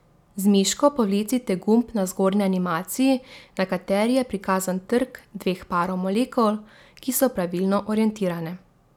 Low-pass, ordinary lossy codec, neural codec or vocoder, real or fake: 19.8 kHz; none; none; real